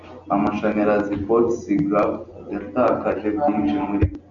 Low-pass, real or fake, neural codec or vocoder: 7.2 kHz; real; none